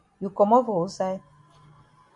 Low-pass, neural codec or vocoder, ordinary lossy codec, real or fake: 10.8 kHz; none; AAC, 64 kbps; real